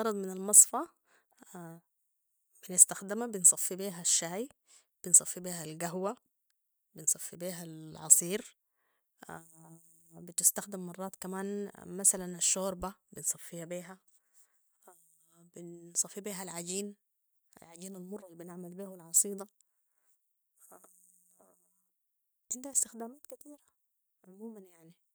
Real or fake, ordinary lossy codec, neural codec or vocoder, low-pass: real; none; none; none